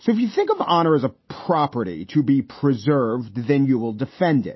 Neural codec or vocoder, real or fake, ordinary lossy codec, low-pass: none; real; MP3, 24 kbps; 7.2 kHz